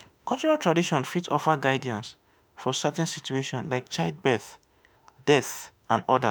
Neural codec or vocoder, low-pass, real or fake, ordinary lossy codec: autoencoder, 48 kHz, 32 numbers a frame, DAC-VAE, trained on Japanese speech; none; fake; none